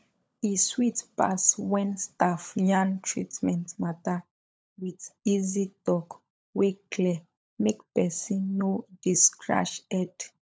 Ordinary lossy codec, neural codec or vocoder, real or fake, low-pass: none; codec, 16 kHz, 16 kbps, FunCodec, trained on LibriTTS, 50 frames a second; fake; none